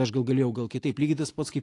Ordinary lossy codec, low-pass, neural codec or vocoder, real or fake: AAC, 48 kbps; 10.8 kHz; none; real